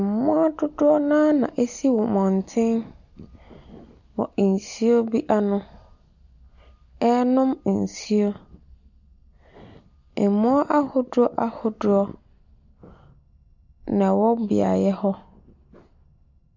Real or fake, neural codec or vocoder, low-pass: real; none; 7.2 kHz